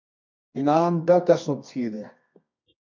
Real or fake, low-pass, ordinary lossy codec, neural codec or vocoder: fake; 7.2 kHz; MP3, 48 kbps; codec, 24 kHz, 0.9 kbps, WavTokenizer, medium music audio release